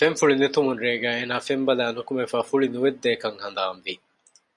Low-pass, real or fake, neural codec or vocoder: 10.8 kHz; real; none